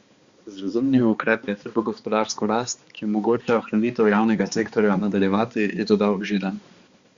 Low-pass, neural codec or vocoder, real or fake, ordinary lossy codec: 7.2 kHz; codec, 16 kHz, 2 kbps, X-Codec, HuBERT features, trained on balanced general audio; fake; Opus, 64 kbps